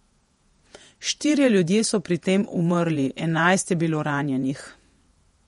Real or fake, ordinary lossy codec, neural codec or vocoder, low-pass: fake; MP3, 48 kbps; vocoder, 48 kHz, 128 mel bands, Vocos; 19.8 kHz